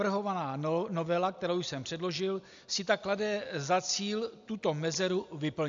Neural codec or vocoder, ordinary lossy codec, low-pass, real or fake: none; AAC, 64 kbps; 7.2 kHz; real